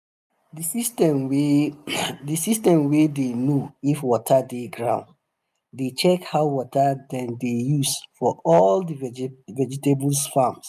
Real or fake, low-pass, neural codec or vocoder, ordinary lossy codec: real; 14.4 kHz; none; none